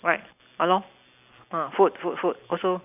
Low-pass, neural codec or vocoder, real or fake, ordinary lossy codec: 3.6 kHz; none; real; none